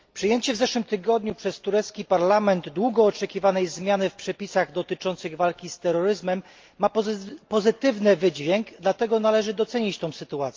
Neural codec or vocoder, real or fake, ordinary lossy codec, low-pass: none; real; Opus, 24 kbps; 7.2 kHz